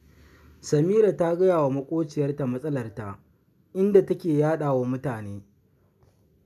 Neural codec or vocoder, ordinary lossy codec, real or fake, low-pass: none; none; real; 14.4 kHz